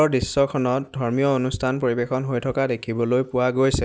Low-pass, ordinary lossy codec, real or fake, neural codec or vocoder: none; none; real; none